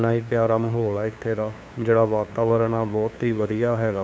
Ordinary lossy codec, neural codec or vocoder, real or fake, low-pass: none; codec, 16 kHz, 2 kbps, FunCodec, trained on LibriTTS, 25 frames a second; fake; none